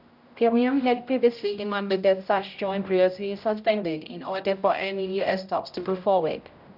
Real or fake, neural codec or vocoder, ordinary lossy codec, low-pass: fake; codec, 16 kHz, 0.5 kbps, X-Codec, HuBERT features, trained on general audio; none; 5.4 kHz